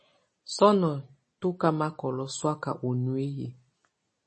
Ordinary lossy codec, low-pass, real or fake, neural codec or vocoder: MP3, 32 kbps; 9.9 kHz; real; none